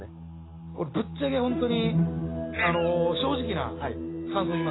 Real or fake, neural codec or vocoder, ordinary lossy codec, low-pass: real; none; AAC, 16 kbps; 7.2 kHz